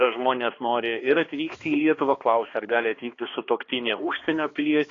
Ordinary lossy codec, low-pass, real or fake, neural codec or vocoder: AAC, 32 kbps; 7.2 kHz; fake; codec, 16 kHz, 2 kbps, X-Codec, HuBERT features, trained on balanced general audio